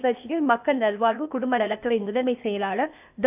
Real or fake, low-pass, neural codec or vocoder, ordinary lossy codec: fake; 3.6 kHz; codec, 16 kHz, 0.8 kbps, ZipCodec; none